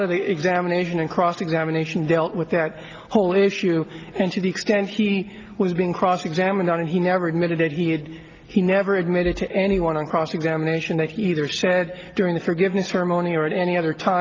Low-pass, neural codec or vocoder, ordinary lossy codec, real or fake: 7.2 kHz; none; Opus, 24 kbps; real